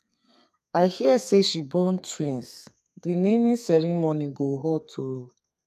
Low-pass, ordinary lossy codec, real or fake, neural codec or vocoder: 14.4 kHz; none; fake; codec, 32 kHz, 1.9 kbps, SNAC